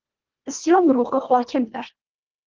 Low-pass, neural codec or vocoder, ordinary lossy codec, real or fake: 7.2 kHz; codec, 24 kHz, 1.5 kbps, HILCodec; Opus, 32 kbps; fake